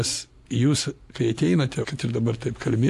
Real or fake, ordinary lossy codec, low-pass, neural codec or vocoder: real; MP3, 96 kbps; 14.4 kHz; none